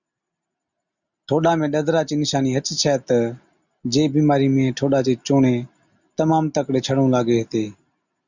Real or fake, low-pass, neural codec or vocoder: real; 7.2 kHz; none